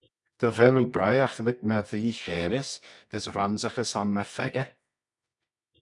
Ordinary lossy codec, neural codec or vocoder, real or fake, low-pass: MP3, 96 kbps; codec, 24 kHz, 0.9 kbps, WavTokenizer, medium music audio release; fake; 10.8 kHz